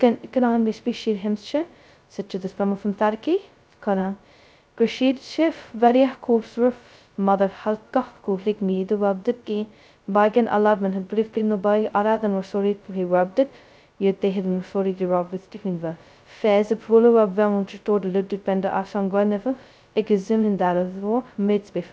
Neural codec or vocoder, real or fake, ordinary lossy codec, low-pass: codec, 16 kHz, 0.2 kbps, FocalCodec; fake; none; none